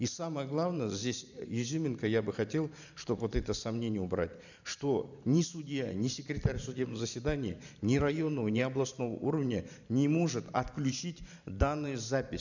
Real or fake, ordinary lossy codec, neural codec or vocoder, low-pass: fake; none; vocoder, 44.1 kHz, 128 mel bands every 512 samples, BigVGAN v2; 7.2 kHz